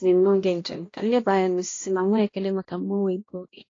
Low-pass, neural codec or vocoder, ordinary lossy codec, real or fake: 7.2 kHz; codec, 16 kHz, 1 kbps, X-Codec, HuBERT features, trained on balanced general audio; AAC, 32 kbps; fake